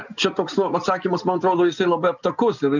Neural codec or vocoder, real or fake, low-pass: none; real; 7.2 kHz